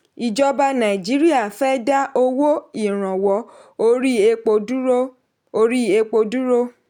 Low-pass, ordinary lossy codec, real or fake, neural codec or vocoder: 19.8 kHz; none; real; none